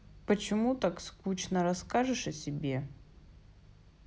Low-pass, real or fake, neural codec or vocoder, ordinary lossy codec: none; real; none; none